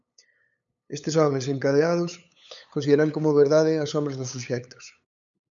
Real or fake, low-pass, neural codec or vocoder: fake; 7.2 kHz; codec, 16 kHz, 8 kbps, FunCodec, trained on LibriTTS, 25 frames a second